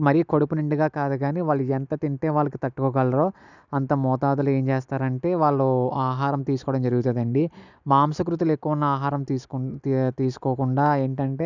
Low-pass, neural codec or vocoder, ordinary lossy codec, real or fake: 7.2 kHz; none; none; real